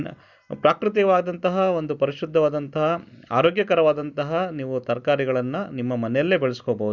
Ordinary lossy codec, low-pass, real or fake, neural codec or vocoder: none; 7.2 kHz; real; none